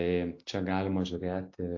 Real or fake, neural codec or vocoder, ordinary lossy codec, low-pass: real; none; AAC, 48 kbps; 7.2 kHz